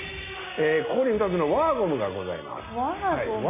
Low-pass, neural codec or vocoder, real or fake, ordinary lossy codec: 3.6 kHz; none; real; MP3, 32 kbps